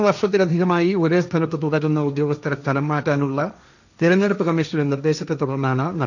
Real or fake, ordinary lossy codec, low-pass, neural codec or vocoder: fake; none; 7.2 kHz; codec, 16 kHz, 1.1 kbps, Voila-Tokenizer